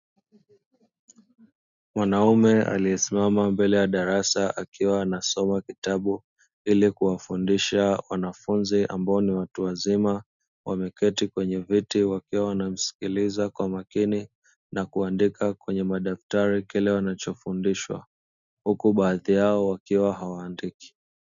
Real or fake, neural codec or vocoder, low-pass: real; none; 7.2 kHz